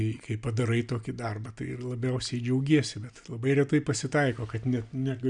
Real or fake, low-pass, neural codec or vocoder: real; 9.9 kHz; none